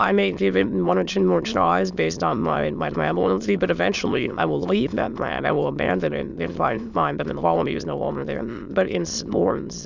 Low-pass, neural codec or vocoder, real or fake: 7.2 kHz; autoencoder, 22.05 kHz, a latent of 192 numbers a frame, VITS, trained on many speakers; fake